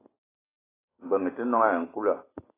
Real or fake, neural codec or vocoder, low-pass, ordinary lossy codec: real; none; 3.6 kHz; AAC, 16 kbps